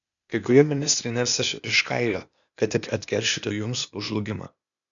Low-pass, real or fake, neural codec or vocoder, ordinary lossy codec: 7.2 kHz; fake; codec, 16 kHz, 0.8 kbps, ZipCodec; MP3, 96 kbps